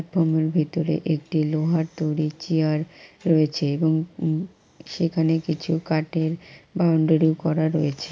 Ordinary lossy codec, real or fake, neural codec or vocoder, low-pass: none; real; none; none